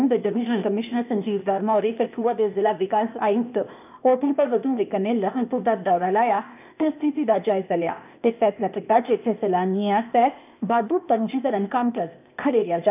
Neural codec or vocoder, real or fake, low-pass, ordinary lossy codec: codec, 16 kHz, 0.8 kbps, ZipCodec; fake; 3.6 kHz; none